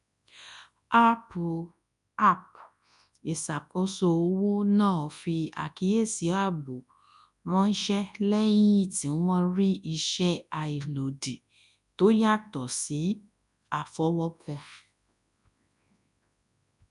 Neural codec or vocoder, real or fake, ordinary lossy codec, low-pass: codec, 24 kHz, 0.9 kbps, WavTokenizer, large speech release; fake; none; 10.8 kHz